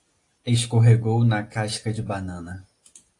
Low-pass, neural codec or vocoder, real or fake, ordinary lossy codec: 10.8 kHz; none; real; AAC, 48 kbps